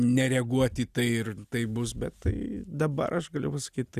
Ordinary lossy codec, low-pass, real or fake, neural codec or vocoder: Opus, 64 kbps; 14.4 kHz; real; none